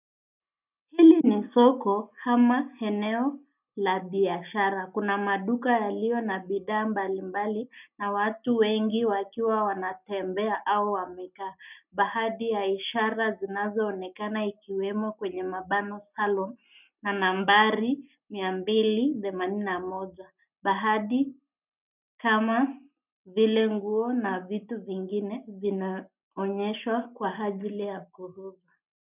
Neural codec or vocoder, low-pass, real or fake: none; 3.6 kHz; real